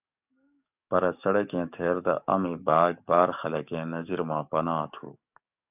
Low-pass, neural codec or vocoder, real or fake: 3.6 kHz; codec, 44.1 kHz, 7.8 kbps, DAC; fake